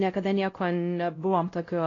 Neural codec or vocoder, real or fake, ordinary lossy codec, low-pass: codec, 16 kHz, 0.5 kbps, X-Codec, WavLM features, trained on Multilingual LibriSpeech; fake; AAC, 32 kbps; 7.2 kHz